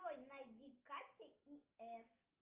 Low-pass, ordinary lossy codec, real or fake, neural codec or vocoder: 3.6 kHz; Opus, 24 kbps; real; none